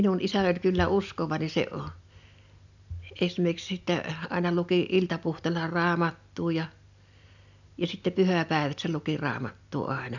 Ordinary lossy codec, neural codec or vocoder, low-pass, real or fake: none; none; 7.2 kHz; real